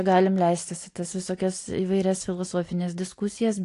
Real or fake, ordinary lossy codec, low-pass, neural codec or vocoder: real; AAC, 48 kbps; 10.8 kHz; none